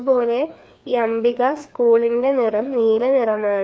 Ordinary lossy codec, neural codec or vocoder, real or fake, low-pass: none; codec, 16 kHz, 2 kbps, FreqCodec, larger model; fake; none